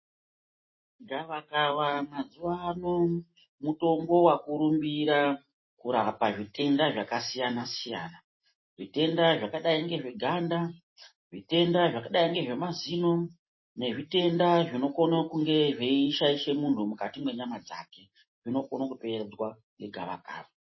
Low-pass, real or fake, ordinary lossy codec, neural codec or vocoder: 7.2 kHz; real; MP3, 24 kbps; none